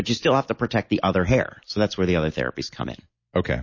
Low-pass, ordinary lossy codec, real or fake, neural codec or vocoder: 7.2 kHz; MP3, 32 kbps; real; none